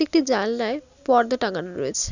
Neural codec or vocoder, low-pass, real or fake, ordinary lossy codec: none; 7.2 kHz; real; none